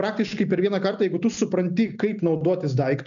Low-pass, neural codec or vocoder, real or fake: 7.2 kHz; none; real